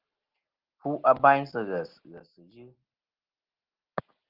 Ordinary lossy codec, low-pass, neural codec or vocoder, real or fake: Opus, 16 kbps; 5.4 kHz; none; real